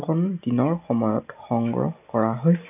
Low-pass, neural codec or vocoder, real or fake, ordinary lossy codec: 3.6 kHz; none; real; none